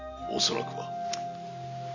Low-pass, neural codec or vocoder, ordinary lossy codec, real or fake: 7.2 kHz; none; none; real